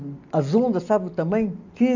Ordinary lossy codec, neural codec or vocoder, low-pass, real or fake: none; none; 7.2 kHz; real